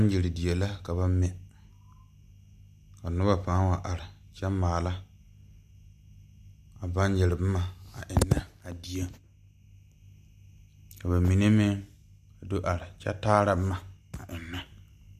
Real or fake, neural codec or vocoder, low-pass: real; none; 14.4 kHz